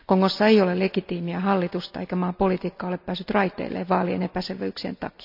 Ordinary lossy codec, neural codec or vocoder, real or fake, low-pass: none; none; real; 5.4 kHz